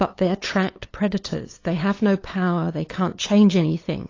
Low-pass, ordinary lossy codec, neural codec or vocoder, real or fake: 7.2 kHz; AAC, 32 kbps; codec, 16 kHz, 8 kbps, FunCodec, trained on LibriTTS, 25 frames a second; fake